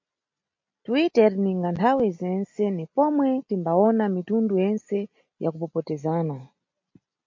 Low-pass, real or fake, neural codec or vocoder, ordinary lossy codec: 7.2 kHz; real; none; MP3, 48 kbps